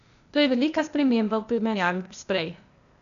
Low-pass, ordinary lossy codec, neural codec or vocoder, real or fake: 7.2 kHz; none; codec, 16 kHz, 0.8 kbps, ZipCodec; fake